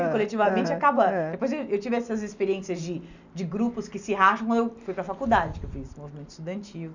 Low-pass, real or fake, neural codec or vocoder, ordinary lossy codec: 7.2 kHz; real; none; none